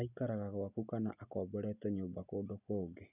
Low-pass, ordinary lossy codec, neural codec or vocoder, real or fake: 3.6 kHz; none; none; real